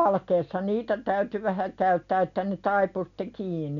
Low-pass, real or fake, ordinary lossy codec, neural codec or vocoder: 7.2 kHz; real; none; none